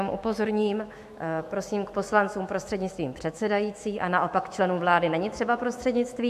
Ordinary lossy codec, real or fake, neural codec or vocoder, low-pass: MP3, 64 kbps; fake; autoencoder, 48 kHz, 128 numbers a frame, DAC-VAE, trained on Japanese speech; 14.4 kHz